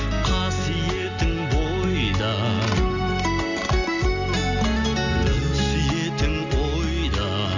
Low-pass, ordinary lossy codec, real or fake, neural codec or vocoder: 7.2 kHz; none; real; none